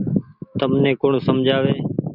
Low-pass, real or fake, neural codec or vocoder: 5.4 kHz; real; none